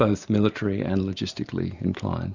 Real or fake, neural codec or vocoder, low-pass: real; none; 7.2 kHz